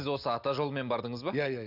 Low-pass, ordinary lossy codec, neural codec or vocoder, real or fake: 5.4 kHz; none; none; real